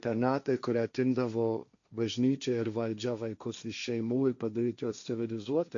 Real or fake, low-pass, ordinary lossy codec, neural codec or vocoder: fake; 7.2 kHz; AAC, 64 kbps; codec, 16 kHz, 1.1 kbps, Voila-Tokenizer